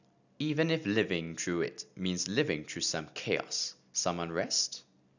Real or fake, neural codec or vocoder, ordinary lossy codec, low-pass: real; none; none; 7.2 kHz